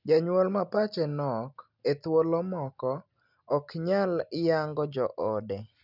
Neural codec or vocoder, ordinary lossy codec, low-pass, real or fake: none; none; 5.4 kHz; real